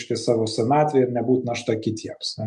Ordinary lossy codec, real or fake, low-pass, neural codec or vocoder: MP3, 64 kbps; real; 10.8 kHz; none